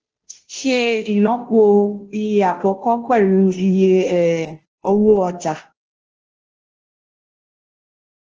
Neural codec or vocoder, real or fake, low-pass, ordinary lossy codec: codec, 16 kHz, 0.5 kbps, FunCodec, trained on Chinese and English, 25 frames a second; fake; 7.2 kHz; Opus, 16 kbps